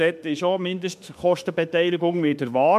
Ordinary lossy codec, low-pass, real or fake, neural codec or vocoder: MP3, 96 kbps; 14.4 kHz; fake; autoencoder, 48 kHz, 32 numbers a frame, DAC-VAE, trained on Japanese speech